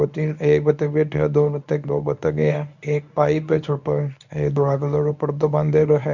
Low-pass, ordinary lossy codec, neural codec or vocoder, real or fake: 7.2 kHz; none; codec, 24 kHz, 0.9 kbps, WavTokenizer, medium speech release version 1; fake